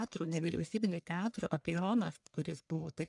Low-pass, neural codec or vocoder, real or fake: 10.8 kHz; codec, 44.1 kHz, 1.7 kbps, Pupu-Codec; fake